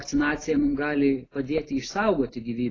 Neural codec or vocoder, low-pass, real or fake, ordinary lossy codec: none; 7.2 kHz; real; AAC, 32 kbps